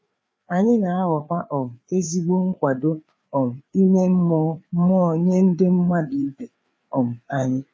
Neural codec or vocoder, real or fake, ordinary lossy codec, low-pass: codec, 16 kHz, 4 kbps, FreqCodec, larger model; fake; none; none